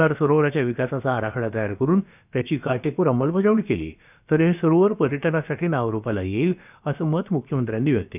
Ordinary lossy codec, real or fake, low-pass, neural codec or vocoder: none; fake; 3.6 kHz; codec, 16 kHz, about 1 kbps, DyCAST, with the encoder's durations